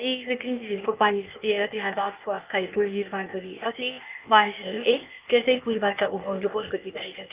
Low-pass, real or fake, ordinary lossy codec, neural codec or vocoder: 3.6 kHz; fake; Opus, 24 kbps; codec, 16 kHz, 0.8 kbps, ZipCodec